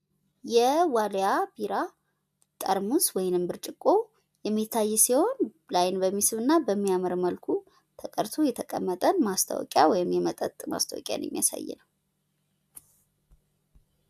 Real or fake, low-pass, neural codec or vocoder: real; 14.4 kHz; none